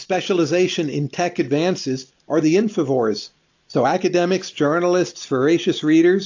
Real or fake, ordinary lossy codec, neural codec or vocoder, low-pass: real; AAC, 48 kbps; none; 7.2 kHz